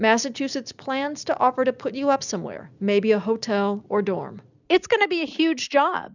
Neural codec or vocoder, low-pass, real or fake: none; 7.2 kHz; real